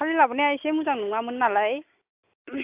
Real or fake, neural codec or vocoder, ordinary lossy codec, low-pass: real; none; AAC, 32 kbps; 3.6 kHz